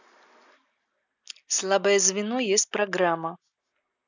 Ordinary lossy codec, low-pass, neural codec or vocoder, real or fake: none; 7.2 kHz; none; real